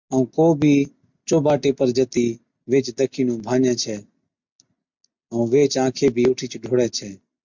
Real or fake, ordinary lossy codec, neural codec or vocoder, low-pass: real; MP3, 64 kbps; none; 7.2 kHz